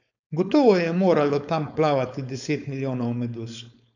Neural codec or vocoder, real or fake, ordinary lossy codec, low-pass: codec, 16 kHz, 4.8 kbps, FACodec; fake; none; 7.2 kHz